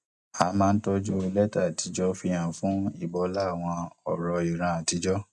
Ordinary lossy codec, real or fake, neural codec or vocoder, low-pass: none; real; none; 10.8 kHz